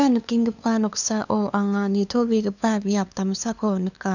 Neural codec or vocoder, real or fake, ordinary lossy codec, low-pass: codec, 16 kHz, 2 kbps, FunCodec, trained on LibriTTS, 25 frames a second; fake; none; 7.2 kHz